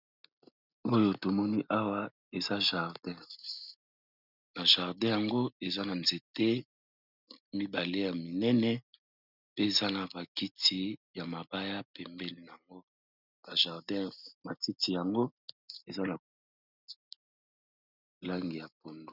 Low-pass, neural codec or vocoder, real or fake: 5.4 kHz; none; real